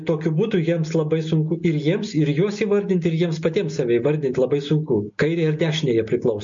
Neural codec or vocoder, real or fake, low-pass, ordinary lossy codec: none; real; 7.2 kHz; MP3, 48 kbps